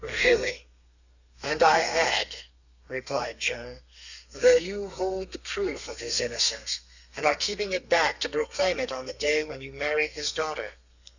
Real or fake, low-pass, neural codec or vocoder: fake; 7.2 kHz; codec, 32 kHz, 1.9 kbps, SNAC